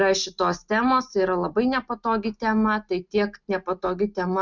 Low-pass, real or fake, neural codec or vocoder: 7.2 kHz; real; none